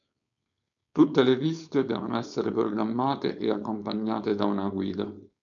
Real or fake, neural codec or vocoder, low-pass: fake; codec, 16 kHz, 4.8 kbps, FACodec; 7.2 kHz